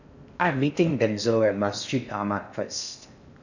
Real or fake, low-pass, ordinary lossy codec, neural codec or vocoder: fake; 7.2 kHz; none; codec, 16 kHz in and 24 kHz out, 0.6 kbps, FocalCodec, streaming, 4096 codes